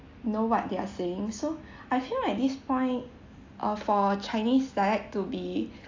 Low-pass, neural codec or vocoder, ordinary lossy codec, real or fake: 7.2 kHz; none; AAC, 48 kbps; real